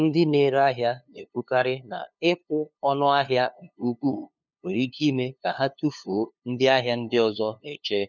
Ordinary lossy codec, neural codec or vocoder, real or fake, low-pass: none; codec, 16 kHz, 2 kbps, FunCodec, trained on LibriTTS, 25 frames a second; fake; 7.2 kHz